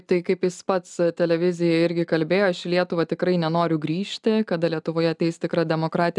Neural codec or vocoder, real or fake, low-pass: none; real; 10.8 kHz